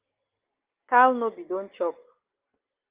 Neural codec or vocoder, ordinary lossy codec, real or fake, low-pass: none; Opus, 32 kbps; real; 3.6 kHz